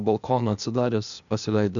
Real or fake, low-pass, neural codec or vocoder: fake; 7.2 kHz; codec, 16 kHz, 0.8 kbps, ZipCodec